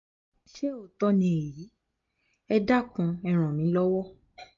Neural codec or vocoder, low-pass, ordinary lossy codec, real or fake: none; 7.2 kHz; MP3, 48 kbps; real